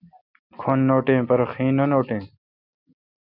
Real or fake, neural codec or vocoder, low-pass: real; none; 5.4 kHz